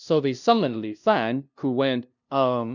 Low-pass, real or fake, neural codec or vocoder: 7.2 kHz; fake; codec, 16 kHz, 0.5 kbps, FunCodec, trained on LibriTTS, 25 frames a second